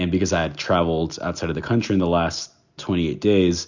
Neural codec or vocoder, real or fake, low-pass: none; real; 7.2 kHz